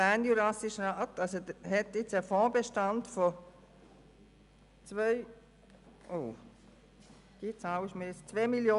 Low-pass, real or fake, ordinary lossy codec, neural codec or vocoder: 10.8 kHz; real; none; none